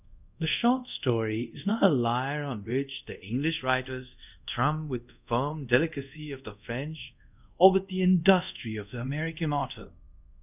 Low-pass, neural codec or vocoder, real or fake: 3.6 kHz; codec, 24 kHz, 0.5 kbps, DualCodec; fake